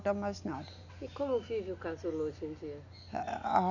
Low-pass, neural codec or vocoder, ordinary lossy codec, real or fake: 7.2 kHz; none; none; real